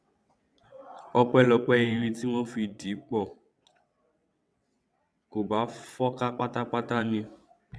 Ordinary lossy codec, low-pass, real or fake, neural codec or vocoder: none; none; fake; vocoder, 22.05 kHz, 80 mel bands, WaveNeXt